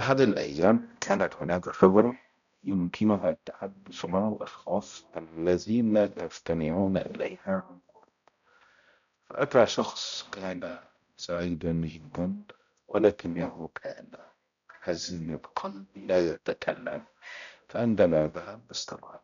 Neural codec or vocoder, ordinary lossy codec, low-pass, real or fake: codec, 16 kHz, 0.5 kbps, X-Codec, HuBERT features, trained on balanced general audio; none; 7.2 kHz; fake